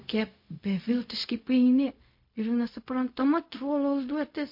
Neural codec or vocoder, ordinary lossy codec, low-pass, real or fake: codec, 16 kHz, 0.4 kbps, LongCat-Audio-Codec; MP3, 32 kbps; 5.4 kHz; fake